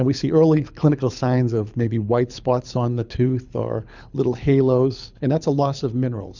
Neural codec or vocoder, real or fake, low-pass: codec, 24 kHz, 6 kbps, HILCodec; fake; 7.2 kHz